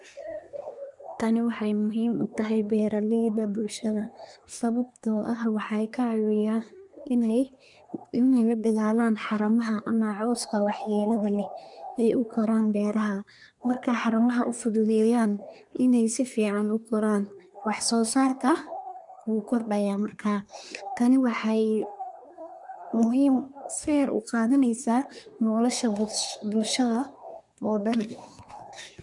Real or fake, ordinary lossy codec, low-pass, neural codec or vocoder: fake; none; 10.8 kHz; codec, 24 kHz, 1 kbps, SNAC